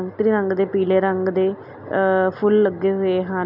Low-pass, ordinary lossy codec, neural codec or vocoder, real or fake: 5.4 kHz; none; none; real